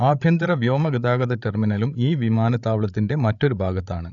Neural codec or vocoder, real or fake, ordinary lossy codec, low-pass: codec, 16 kHz, 16 kbps, FreqCodec, larger model; fake; none; 7.2 kHz